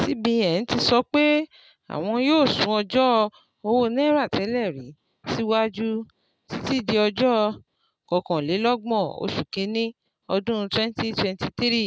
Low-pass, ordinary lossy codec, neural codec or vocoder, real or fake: none; none; none; real